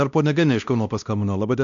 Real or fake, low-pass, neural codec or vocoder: fake; 7.2 kHz; codec, 16 kHz, 1 kbps, X-Codec, WavLM features, trained on Multilingual LibriSpeech